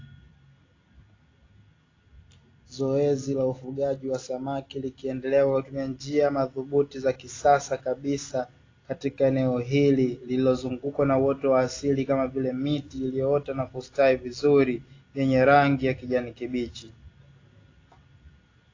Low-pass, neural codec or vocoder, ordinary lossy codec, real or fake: 7.2 kHz; none; AAC, 32 kbps; real